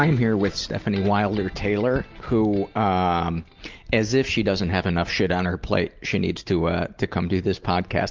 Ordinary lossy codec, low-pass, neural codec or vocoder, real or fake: Opus, 24 kbps; 7.2 kHz; none; real